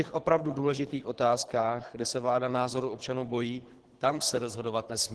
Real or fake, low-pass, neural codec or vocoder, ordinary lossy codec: fake; 10.8 kHz; codec, 24 kHz, 3 kbps, HILCodec; Opus, 16 kbps